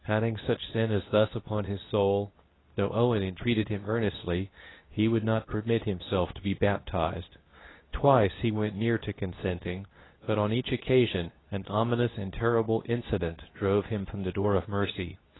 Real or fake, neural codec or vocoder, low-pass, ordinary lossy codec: fake; codec, 24 kHz, 0.9 kbps, WavTokenizer, medium speech release version 2; 7.2 kHz; AAC, 16 kbps